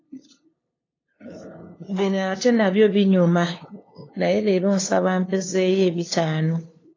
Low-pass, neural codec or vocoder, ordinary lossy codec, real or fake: 7.2 kHz; codec, 16 kHz, 2 kbps, FunCodec, trained on LibriTTS, 25 frames a second; AAC, 32 kbps; fake